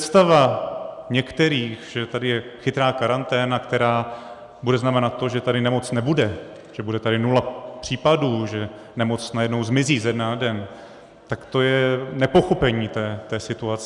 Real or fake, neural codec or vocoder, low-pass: real; none; 10.8 kHz